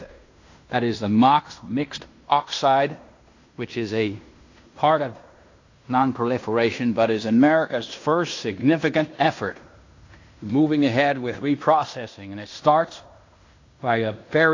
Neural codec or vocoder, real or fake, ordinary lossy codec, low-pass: codec, 16 kHz in and 24 kHz out, 0.9 kbps, LongCat-Audio-Codec, fine tuned four codebook decoder; fake; AAC, 48 kbps; 7.2 kHz